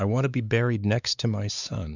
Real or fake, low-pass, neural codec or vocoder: fake; 7.2 kHz; codec, 16 kHz, 4 kbps, X-Codec, WavLM features, trained on Multilingual LibriSpeech